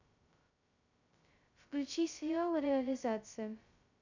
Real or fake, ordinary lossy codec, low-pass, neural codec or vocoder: fake; none; 7.2 kHz; codec, 16 kHz, 0.2 kbps, FocalCodec